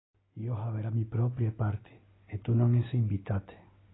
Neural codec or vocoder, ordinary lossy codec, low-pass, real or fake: none; AAC, 16 kbps; 7.2 kHz; real